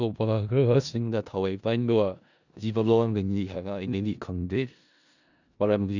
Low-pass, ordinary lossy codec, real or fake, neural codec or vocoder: 7.2 kHz; none; fake; codec, 16 kHz in and 24 kHz out, 0.4 kbps, LongCat-Audio-Codec, four codebook decoder